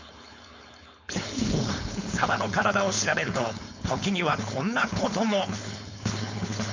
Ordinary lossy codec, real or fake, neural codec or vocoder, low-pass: MP3, 64 kbps; fake; codec, 16 kHz, 4.8 kbps, FACodec; 7.2 kHz